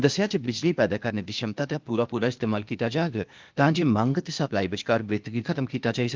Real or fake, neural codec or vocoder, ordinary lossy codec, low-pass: fake; codec, 16 kHz, 0.8 kbps, ZipCodec; Opus, 32 kbps; 7.2 kHz